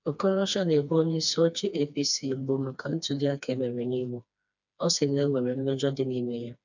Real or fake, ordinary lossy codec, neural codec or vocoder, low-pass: fake; none; codec, 16 kHz, 2 kbps, FreqCodec, smaller model; 7.2 kHz